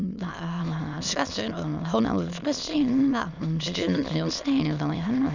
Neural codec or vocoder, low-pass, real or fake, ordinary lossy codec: autoencoder, 22.05 kHz, a latent of 192 numbers a frame, VITS, trained on many speakers; 7.2 kHz; fake; none